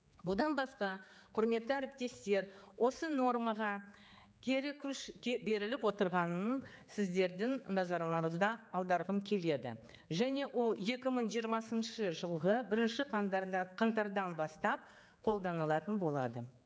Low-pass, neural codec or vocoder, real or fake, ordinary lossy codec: none; codec, 16 kHz, 4 kbps, X-Codec, HuBERT features, trained on general audio; fake; none